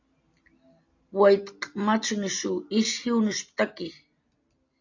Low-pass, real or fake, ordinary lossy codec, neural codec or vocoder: 7.2 kHz; real; AAC, 48 kbps; none